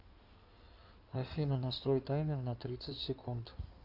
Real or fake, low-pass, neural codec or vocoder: fake; 5.4 kHz; codec, 16 kHz in and 24 kHz out, 2.2 kbps, FireRedTTS-2 codec